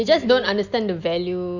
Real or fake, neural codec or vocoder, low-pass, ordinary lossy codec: real; none; 7.2 kHz; none